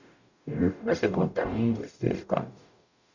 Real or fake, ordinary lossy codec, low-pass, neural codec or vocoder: fake; none; 7.2 kHz; codec, 44.1 kHz, 0.9 kbps, DAC